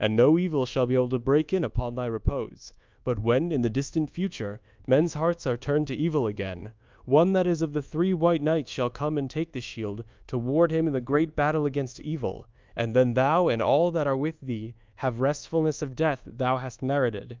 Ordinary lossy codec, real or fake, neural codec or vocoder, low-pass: Opus, 24 kbps; fake; codec, 24 kHz, 1.2 kbps, DualCodec; 7.2 kHz